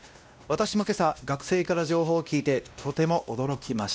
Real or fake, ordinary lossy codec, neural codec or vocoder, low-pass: fake; none; codec, 16 kHz, 1 kbps, X-Codec, WavLM features, trained on Multilingual LibriSpeech; none